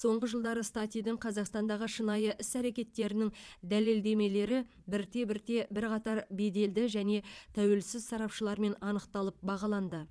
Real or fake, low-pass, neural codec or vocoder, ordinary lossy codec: fake; none; vocoder, 22.05 kHz, 80 mel bands, Vocos; none